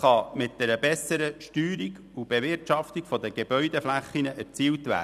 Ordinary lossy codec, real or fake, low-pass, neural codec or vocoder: none; real; 14.4 kHz; none